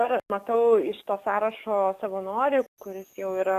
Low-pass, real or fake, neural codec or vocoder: 14.4 kHz; fake; codec, 44.1 kHz, 7.8 kbps, DAC